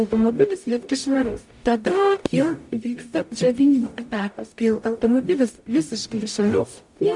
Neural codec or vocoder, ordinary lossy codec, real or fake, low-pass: codec, 44.1 kHz, 0.9 kbps, DAC; MP3, 64 kbps; fake; 10.8 kHz